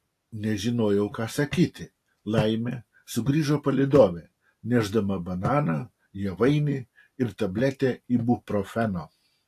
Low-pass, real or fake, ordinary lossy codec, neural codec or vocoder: 14.4 kHz; real; AAC, 64 kbps; none